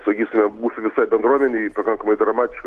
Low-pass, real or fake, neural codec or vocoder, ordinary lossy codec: 14.4 kHz; real; none; Opus, 16 kbps